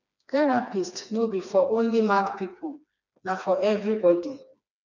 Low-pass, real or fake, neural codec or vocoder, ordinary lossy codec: 7.2 kHz; fake; codec, 16 kHz, 2 kbps, FreqCodec, smaller model; none